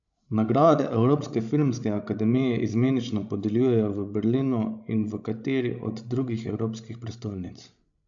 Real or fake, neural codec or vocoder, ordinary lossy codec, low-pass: fake; codec, 16 kHz, 16 kbps, FreqCodec, larger model; AAC, 64 kbps; 7.2 kHz